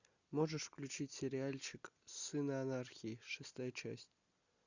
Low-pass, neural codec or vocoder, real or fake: 7.2 kHz; none; real